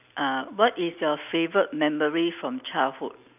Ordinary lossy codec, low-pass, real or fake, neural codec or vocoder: none; 3.6 kHz; real; none